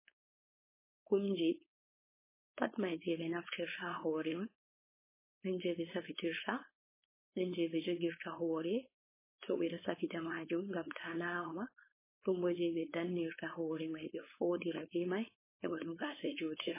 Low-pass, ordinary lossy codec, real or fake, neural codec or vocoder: 3.6 kHz; MP3, 16 kbps; fake; codec, 16 kHz, 4.8 kbps, FACodec